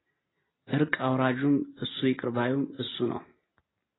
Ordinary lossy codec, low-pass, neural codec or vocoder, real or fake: AAC, 16 kbps; 7.2 kHz; none; real